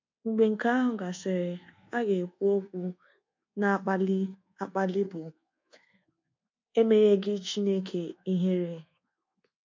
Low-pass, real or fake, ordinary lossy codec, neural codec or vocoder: 7.2 kHz; fake; MP3, 48 kbps; codec, 24 kHz, 1.2 kbps, DualCodec